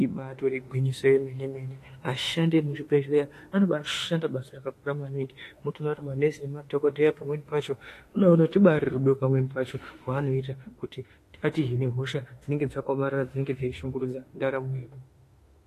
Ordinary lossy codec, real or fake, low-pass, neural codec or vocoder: AAC, 48 kbps; fake; 14.4 kHz; autoencoder, 48 kHz, 32 numbers a frame, DAC-VAE, trained on Japanese speech